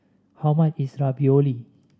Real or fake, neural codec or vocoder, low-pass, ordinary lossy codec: real; none; none; none